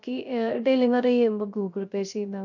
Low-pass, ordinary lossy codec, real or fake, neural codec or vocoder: 7.2 kHz; none; fake; codec, 16 kHz, 0.3 kbps, FocalCodec